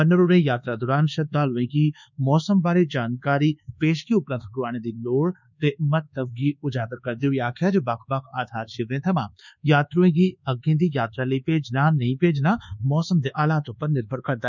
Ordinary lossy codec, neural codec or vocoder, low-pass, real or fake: none; codec, 24 kHz, 1.2 kbps, DualCodec; 7.2 kHz; fake